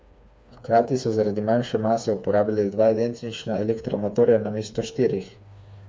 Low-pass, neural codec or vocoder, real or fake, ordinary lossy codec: none; codec, 16 kHz, 4 kbps, FreqCodec, smaller model; fake; none